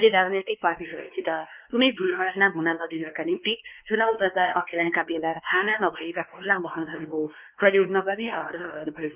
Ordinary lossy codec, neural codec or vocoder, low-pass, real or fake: Opus, 24 kbps; codec, 16 kHz, 2 kbps, X-Codec, WavLM features, trained on Multilingual LibriSpeech; 3.6 kHz; fake